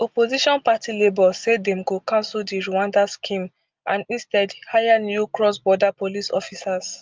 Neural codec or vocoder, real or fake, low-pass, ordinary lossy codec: none; real; 7.2 kHz; Opus, 24 kbps